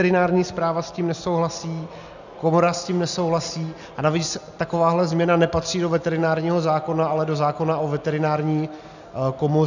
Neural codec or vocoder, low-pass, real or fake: none; 7.2 kHz; real